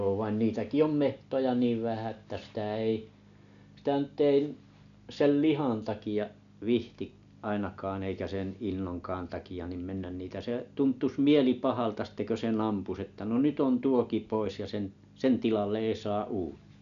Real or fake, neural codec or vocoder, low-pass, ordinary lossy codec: real; none; 7.2 kHz; none